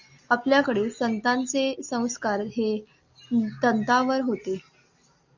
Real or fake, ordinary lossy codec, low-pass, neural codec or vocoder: real; Opus, 64 kbps; 7.2 kHz; none